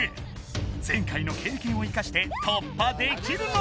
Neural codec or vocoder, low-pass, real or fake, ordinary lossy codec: none; none; real; none